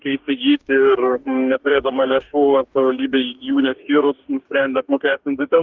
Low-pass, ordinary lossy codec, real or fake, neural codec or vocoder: 7.2 kHz; Opus, 32 kbps; fake; codec, 44.1 kHz, 2.6 kbps, DAC